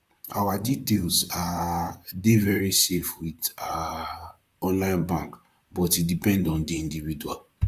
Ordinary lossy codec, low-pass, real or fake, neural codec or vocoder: Opus, 64 kbps; 14.4 kHz; fake; vocoder, 44.1 kHz, 128 mel bands, Pupu-Vocoder